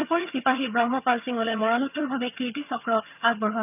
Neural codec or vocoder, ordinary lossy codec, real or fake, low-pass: vocoder, 22.05 kHz, 80 mel bands, HiFi-GAN; none; fake; 3.6 kHz